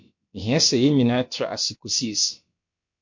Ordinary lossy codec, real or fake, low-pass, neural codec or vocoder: MP3, 48 kbps; fake; 7.2 kHz; codec, 16 kHz, about 1 kbps, DyCAST, with the encoder's durations